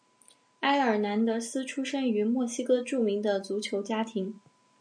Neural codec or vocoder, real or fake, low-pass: none; real; 9.9 kHz